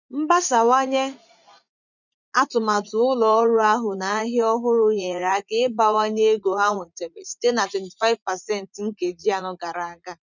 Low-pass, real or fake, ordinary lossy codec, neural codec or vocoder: 7.2 kHz; fake; none; vocoder, 44.1 kHz, 80 mel bands, Vocos